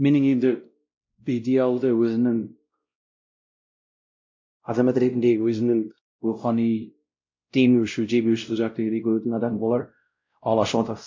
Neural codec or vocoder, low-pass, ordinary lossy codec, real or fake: codec, 16 kHz, 0.5 kbps, X-Codec, WavLM features, trained on Multilingual LibriSpeech; 7.2 kHz; MP3, 48 kbps; fake